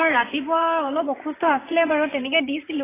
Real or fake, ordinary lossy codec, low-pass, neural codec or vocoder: fake; AAC, 16 kbps; 3.6 kHz; vocoder, 44.1 kHz, 128 mel bands, Pupu-Vocoder